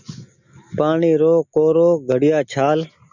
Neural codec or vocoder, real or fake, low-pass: none; real; 7.2 kHz